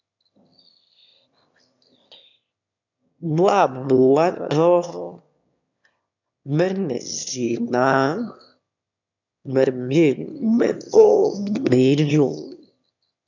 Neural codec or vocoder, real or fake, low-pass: autoencoder, 22.05 kHz, a latent of 192 numbers a frame, VITS, trained on one speaker; fake; 7.2 kHz